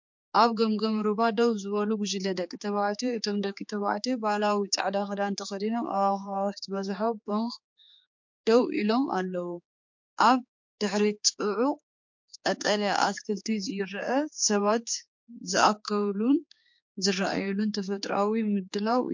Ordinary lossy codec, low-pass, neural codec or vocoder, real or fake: MP3, 48 kbps; 7.2 kHz; codec, 16 kHz, 4 kbps, X-Codec, HuBERT features, trained on general audio; fake